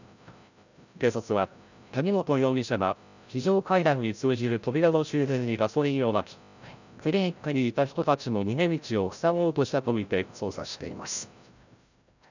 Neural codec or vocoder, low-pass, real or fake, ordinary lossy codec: codec, 16 kHz, 0.5 kbps, FreqCodec, larger model; 7.2 kHz; fake; none